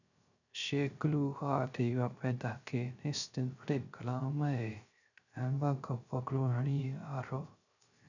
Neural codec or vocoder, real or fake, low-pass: codec, 16 kHz, 0.3 kbps, FocalCodec; fake; 7.2 kHz